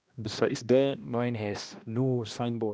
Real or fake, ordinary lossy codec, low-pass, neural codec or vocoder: fake; none; none; codec, 16 kHz, 1 kbps, X-Codec, HuBERT features, trained on balanced general audio